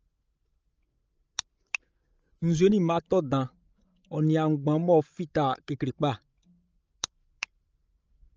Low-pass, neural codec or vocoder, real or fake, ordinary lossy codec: 7.2 kHz; codec, 16 kHz, 16 kbps, FreqCodec, larger model; fake; Opus, 24 kbps